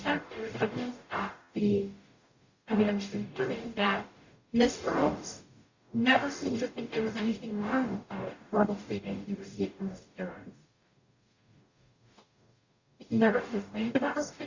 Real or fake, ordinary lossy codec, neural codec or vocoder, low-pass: fake; Opus, 64 kbps; codec, 44.1 kHz, 0.9 kbps, DAC; 7.2 kHz